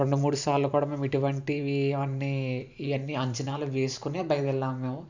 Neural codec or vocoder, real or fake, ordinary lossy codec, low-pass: vocoder, 44.1 kHz, 128 mel bands every 512 samples, BigVGAN v2; fake; none; 7.2 kHz